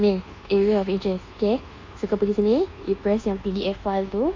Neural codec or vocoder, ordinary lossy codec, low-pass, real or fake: codec, 24 kHz, 1.2 kbps, DualCodec; AAC, 32 kbps; 7.2 kHz; fake